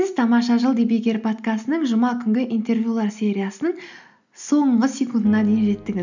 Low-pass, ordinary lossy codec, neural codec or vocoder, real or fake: 7.2 kHz; none; none; real